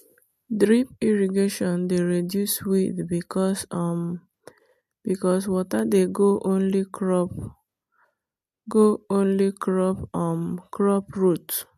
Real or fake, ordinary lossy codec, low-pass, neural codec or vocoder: real; MP3, 64 kbps; 14.4 kHz; none